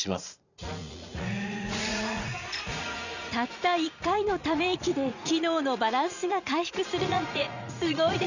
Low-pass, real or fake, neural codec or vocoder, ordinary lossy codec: 7.2 kHz; fake; vocoder, 44.1 kHz, 80 mel bands, Vocos; none